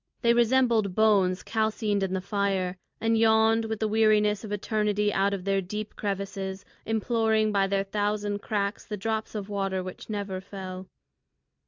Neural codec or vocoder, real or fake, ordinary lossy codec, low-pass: none; real; MP3, 64 kbps; 7.2 kHz